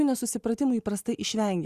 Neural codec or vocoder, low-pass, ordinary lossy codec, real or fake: none; 14.4 kHz; Opus, 64 kbps; real